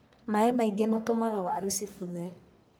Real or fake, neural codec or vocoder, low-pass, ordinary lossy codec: fake; codec, 44.1 kHz, 1.7 kbps, Pupu-Codec; none; none